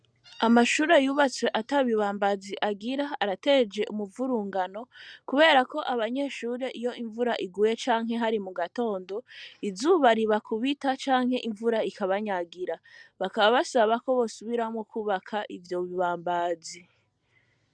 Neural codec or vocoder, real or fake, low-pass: none; real; 9.9 kHz